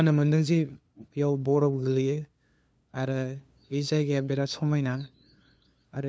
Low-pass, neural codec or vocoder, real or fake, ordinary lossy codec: none; codec, 16 kHz, 2 kbps, FunCodec, trained on LibriTTS, 25 frames a second; fake; none